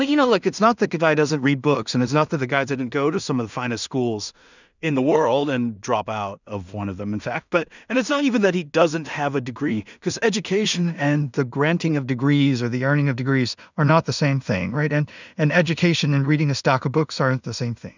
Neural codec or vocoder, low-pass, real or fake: codec, 16 kHz in and 24 kHz out, 0.4 kbps, LongCat-Audio-Codec, two codebook decoder; 7.2 kHz; fake